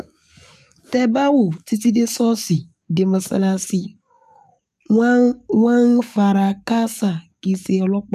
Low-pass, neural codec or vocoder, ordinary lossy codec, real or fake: 14.4 kHz; codec, 44.1 kHz, 7.8 kbps, DAC; none; fake